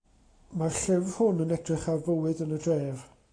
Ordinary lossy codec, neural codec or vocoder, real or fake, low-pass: AAC, 32 kbps; none; real; 9.9 kHz